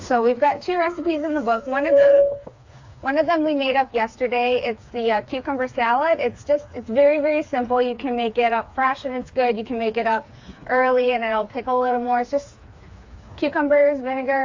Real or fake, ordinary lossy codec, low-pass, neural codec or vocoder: fake; AAC, 48 kbps; 7.2 kHz; codec, 16 kHz, 4 kbps, FreqCodec, smaller model